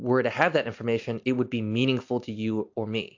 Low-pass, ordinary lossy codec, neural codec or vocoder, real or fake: 7.2 kHz; AAC, 48 kbps; none; real